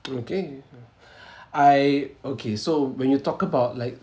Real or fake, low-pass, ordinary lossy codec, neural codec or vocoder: real; none; none; none